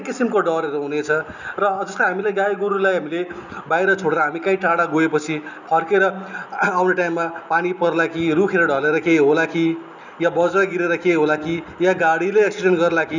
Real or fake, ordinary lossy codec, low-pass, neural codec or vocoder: real; none; 7.2 kHz; none